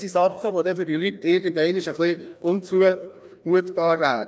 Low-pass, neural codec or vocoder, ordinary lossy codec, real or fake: none; codec, 16 kHz, 1 kbps, FreqCodec, larger model; none; fake